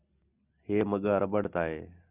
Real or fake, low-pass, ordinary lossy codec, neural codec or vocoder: real; 3.6 kHz; Opus, 64 kbps; none